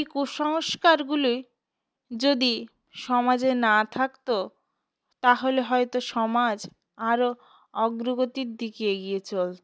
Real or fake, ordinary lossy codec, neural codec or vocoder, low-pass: real; none; none; none